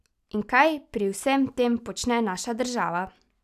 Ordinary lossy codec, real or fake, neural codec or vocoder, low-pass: none; real; none; 14.4 kHz